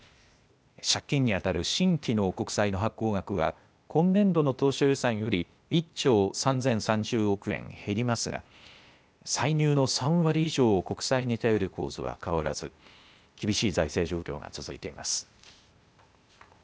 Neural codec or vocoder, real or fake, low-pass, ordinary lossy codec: codec, 16 kHz, 0.8 kbps, ZipCodec; fake; none; none